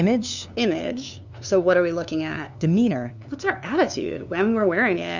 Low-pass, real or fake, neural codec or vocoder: 7.2 kHz; fake; codec, 16 kHz, 2 kbps, FunCodec, trained on LibriTTS, 25 frames a second